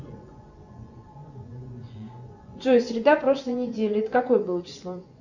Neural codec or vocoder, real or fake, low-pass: vocoder, 24 kHz, 100 mel bands, Vocos; fake; 7.2 kHz